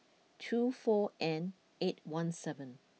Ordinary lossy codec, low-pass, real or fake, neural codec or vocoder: none; none; real; none